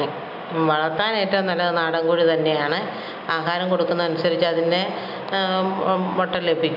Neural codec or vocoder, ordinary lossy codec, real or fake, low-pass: none; none; real; 5.4 kHz